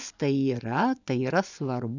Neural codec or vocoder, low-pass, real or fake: vocoder, 24 kHz, 100 mel bands, Vocos; 7.2 kHz; fake